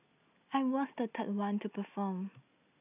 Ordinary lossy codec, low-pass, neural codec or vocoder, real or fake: none; 3.6 kHz; none; real